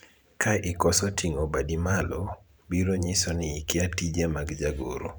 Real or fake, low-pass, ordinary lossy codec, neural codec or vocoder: fake; none; none; vocoder, 44.1 kHz, 128 mel bands every 512 samples, BigVGAN v2